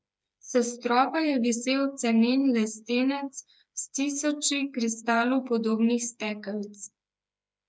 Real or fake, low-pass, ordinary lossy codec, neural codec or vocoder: fake; none; none; codec, 16 kHz, 4 kbps, FreqCodec, smaller model